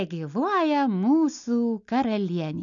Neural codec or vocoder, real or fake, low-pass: none; real; 7.2 kHz